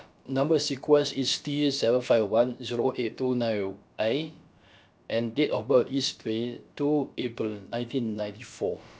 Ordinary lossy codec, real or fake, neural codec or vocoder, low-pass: none; fake; codec, 16 kHz, about 1 kbps, DyCAST, with the encoder's durations; none